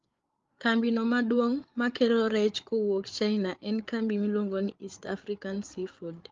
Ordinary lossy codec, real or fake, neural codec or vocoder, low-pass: Opus, 32 kbps; fake; codec, 16 kHz, 8 kbps, FreqCodec, larger model; 7.2 kHz